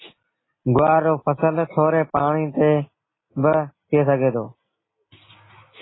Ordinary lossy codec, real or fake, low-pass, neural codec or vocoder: AAC, 16 kbps; real; 7.2 kHz; none